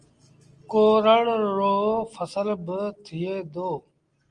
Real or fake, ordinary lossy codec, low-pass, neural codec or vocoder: real; Opus, 24 kbps; 9.9 kHz; none